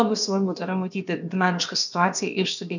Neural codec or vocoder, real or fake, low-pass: codec, 16 kHz, about 1 kbps, DyCAST, with the encoder's durations; fake; 7.2 kHz